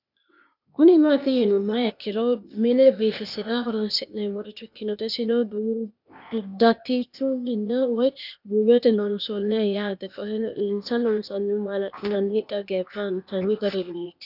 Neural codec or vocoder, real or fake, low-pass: codec, 16 kHz, 0.8 kbps, ZipCodec; fake; 5.4 kHz